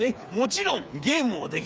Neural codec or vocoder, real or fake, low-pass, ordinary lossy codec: codec, 16 kHz, 4 kbps, FreqCodec, smaller model; fake; none; none